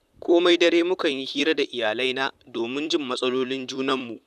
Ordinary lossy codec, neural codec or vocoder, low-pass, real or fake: none; vocoder, 44.1 kHz, 128 mel bands, Pupu-Vocoder; 14.4 kHz; fake